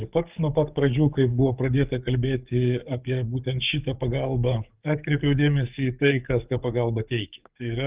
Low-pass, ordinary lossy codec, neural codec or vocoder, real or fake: 3.6 kHz; Opus, 32 kbps; codec, 44.1 kHz, 7.8 kbps, DAC; fake